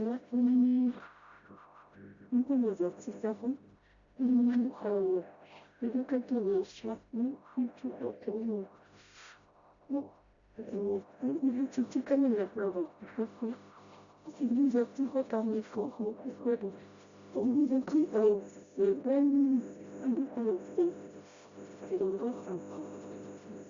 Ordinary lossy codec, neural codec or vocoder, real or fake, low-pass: Opus, 64 kbps; codec, 16 kHz, 0.5 kbps, FreqCodec, smaller model; fake; 7.2 kHz